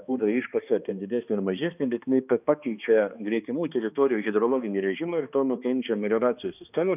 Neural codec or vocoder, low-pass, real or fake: codec, 16 kHz, 2 kbps, X-Codec, HuBERT features, trained on balanced general audio; 3.6 kHz; fake